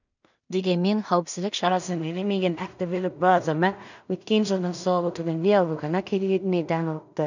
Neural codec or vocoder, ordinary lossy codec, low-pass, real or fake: codec, 16 kHz in and 24 kHz out, 0.4 kbps, LongCat-Audio-Codec, two codebook decoder; none; 7.2 kHz; fake